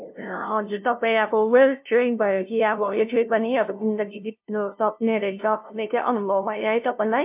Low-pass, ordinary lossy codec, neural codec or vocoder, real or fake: 3.6 kHz; MP3, 32 kbps; codec, 16 kHz, 0.5 kbps, FunCodec, trained on LibriTTS, 25 frames a second; fake